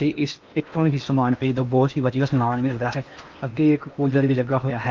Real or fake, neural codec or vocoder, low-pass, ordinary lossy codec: fake; codec, 16 kHz in and 24 kHz out, 0.8 kbps, FocalCodec, streaming, 65536 codes; 7.2 kHz; Opus, 24 kbps